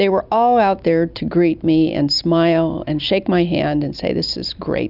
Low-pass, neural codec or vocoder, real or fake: 5.4 kHz; none; real